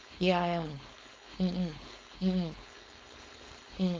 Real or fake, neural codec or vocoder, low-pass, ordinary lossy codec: fake; codec, 16 kHz, 4.8 kbps, FACodec; none; none